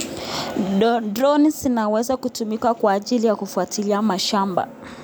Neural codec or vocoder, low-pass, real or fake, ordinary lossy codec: none; none; real; none